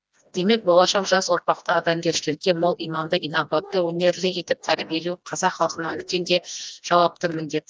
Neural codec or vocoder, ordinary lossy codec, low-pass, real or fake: codec, 16 kHz, 1 kbps, FreqCodec, smaller model; none; none; fake